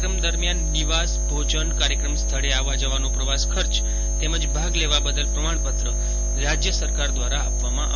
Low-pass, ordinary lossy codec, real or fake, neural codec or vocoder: 7.2 kHz; none; real; none